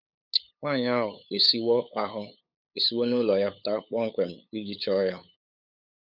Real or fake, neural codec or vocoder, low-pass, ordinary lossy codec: fake; codec, 16 kHz, 8 kbps, FunCodec, trained on LibriTTS, 25 frames a second; 5.4 kHz; none